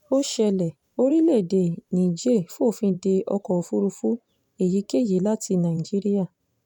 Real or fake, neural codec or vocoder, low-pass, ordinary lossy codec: fake; vocoder, 44.1 kHz, 128 mel bands every 512 samples, BigVGAN v2; 19.8 kHz; none